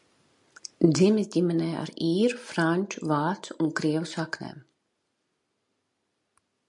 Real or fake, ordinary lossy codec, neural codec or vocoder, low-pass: real; MP3, 64 kbps; none; 10.8 kHz